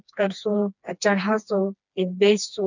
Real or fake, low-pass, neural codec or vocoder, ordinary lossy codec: fake; 7.2 kHz; codec, 16 kHz, 2 kbps, FreqCodec, smaller model; none